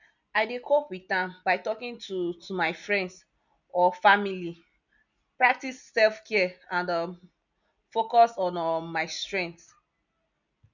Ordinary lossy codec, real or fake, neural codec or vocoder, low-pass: none; real; none; 7.2 kHz